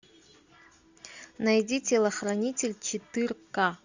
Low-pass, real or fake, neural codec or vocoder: 7.2 kHz; real; none